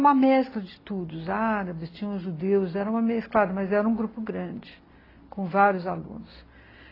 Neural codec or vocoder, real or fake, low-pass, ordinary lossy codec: none; real; 5.4 kHz; AAC, 24 kbps